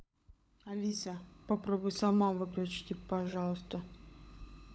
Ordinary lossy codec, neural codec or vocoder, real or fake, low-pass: none; codec, 16 kHz, 16 kbps, FunCodec, trained on LibriTTS, 50 frames a second; fake; none